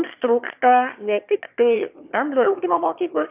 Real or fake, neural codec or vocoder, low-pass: fake; autoencoder, 22.05 kHz, a latent of 192 numbers a frame, VITS, trained on one speaker; 3.6 kHz